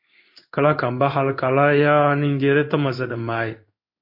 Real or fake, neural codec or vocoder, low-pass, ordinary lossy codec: fake; codec, 16 kHz in and 24 kHz out, 1 kbps, XY-Tokenizer; 5.4 kHz; MP3, 48 kbps